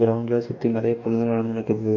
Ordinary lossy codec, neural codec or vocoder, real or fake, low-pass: none; codec, 44.1 kHz, 2.6 kbps, DAC; fake; 7.2 kHz